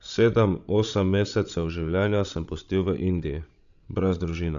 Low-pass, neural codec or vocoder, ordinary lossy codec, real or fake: 7.2 kHz; codec, 16 kHz, 16 kbps, FreqCodec, larger model; none; fake